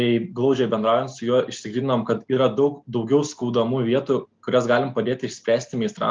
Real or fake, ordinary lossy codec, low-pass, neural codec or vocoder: real; Opus, 24 kbps; 7.2 kHz; none